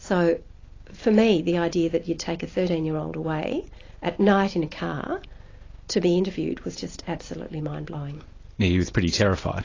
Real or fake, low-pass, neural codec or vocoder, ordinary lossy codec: real; 7.2 kHz; none; AAC, 32 kbps